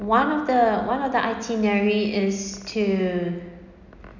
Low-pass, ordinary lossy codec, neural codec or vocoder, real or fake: 7.2 kHz; none; none; real